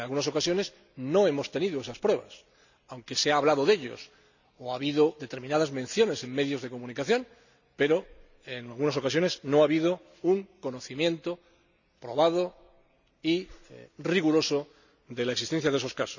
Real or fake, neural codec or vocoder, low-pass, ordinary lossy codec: real; none; 7.2 kHz; none